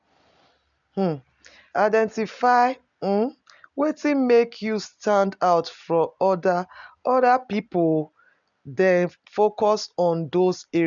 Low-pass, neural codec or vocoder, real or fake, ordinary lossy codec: 7.2 kHz; none; real; none